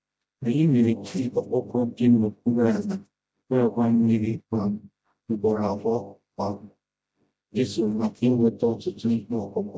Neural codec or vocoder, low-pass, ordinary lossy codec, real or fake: codec, 16 kHz, 0.5 kbps, FreqCodec, smaller model; none; none; fake